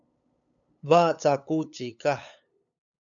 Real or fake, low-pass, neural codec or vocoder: fake; 7.2 kHz; codec, 16 kHz, 8 kbps, FunCodec, trained on LibriTTS, 25 frames a second